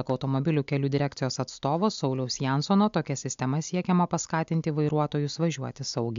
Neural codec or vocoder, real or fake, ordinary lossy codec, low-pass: none; real; MP3, 64 kbps; 7.2 kHz